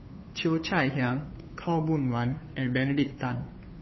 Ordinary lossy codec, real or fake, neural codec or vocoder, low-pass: MP3, 24 kbps; fake; codec, 16 kHz, 2 kbps, FunCodec, trained on Chinese and English, 25 frames a second; 7.2 kHz